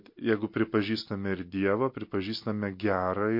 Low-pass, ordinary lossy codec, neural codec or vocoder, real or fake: 5.4 kHz; MP3, 32 kbps; none; real